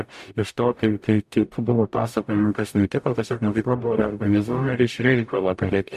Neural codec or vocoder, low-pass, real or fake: codec, 44.1 kHz, 0.9 kbps, DAC; 14.4 kHz; fake